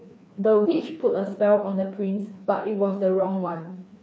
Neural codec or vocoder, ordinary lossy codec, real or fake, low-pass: codec, 16 kHz, 2 kbps, FreqCodec, larger model; none; fake; none